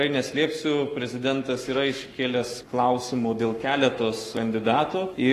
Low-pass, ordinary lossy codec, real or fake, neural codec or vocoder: 14.4 kHz; AAC, 48 kbps; fake; codec, 44.1 kHz, 7.8 kbps, Pupu-Codec